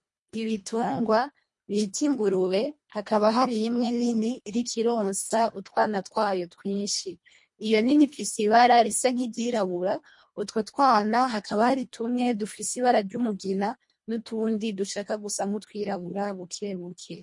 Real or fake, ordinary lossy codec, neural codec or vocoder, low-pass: fake; MP3, 48 kbps; codec, 24 kHz, 1.5 kbps, HILCodec; 10.8 kHz